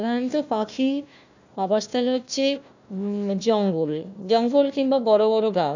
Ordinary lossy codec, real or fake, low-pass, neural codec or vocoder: none; fake; 7.2 kHz; codec, 16 kHz, 1 kbps, FunCodec, trained on Chinese and English, 50 frames a second